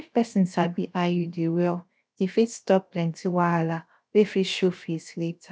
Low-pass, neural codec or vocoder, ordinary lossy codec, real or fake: none; codec, 16 kHz, about 1 kbps, DyCAST, with the encoder's durations; none; fake